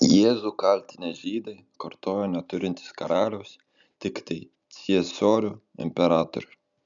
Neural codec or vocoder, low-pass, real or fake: none; 7.2 kHz; real